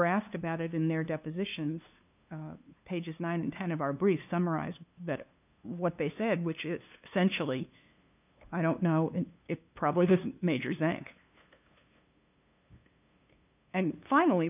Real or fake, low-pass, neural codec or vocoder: fake; 3.6 kHz; codec, 16 kHz, 2 kbps, FunCodec, trained on LibriTTS, 25 frames a second